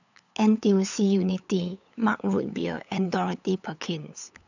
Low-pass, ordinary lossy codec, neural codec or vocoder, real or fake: 7.2 kHz; none; codec, 16 kHz, 8 kbps, FunCodec, trained on LibriTTS, 25 frames a second; fake